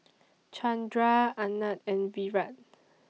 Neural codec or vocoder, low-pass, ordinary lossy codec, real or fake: none; none; none; real